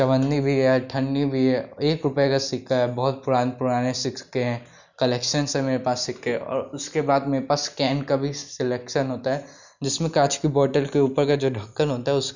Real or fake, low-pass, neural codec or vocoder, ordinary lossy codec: real; 7.2 kHz; none; none